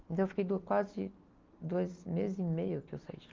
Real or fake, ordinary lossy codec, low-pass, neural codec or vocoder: real; Opus, 32 kbps; 7.2 kHz; none